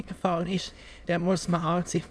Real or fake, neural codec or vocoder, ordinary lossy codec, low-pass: fake; autoencoder, 22.05 kHz, a latent of 192 numbers a frame, VITS, trained on many speakers; none; none